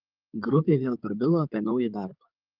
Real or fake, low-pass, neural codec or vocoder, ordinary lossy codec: fake; 5.4 kHz; codec, 16 kHz in and 24 kHz out, 2.2 kbps, FireRedTTS-2 codec; Opus, 32 kbps